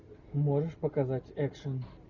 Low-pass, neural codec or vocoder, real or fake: 7.2 kHz; none; real